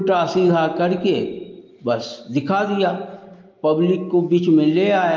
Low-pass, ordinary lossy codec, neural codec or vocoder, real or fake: 7.2 kHz; Opus, 24 kbps; none; real